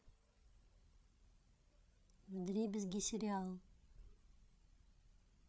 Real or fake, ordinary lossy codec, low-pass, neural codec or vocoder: fake; none; none; codec, 16 kHz, 16 kbps, FreqCodec, larger model